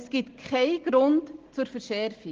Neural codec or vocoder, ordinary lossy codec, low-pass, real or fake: none; Opus, 16 kbps; 7.2 kHz; real